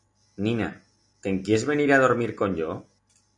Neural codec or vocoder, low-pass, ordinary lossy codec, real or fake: none; 10.8 kHz; MP3, 64 kbps; real